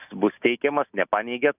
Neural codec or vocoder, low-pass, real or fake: none; 3.6 kHz; real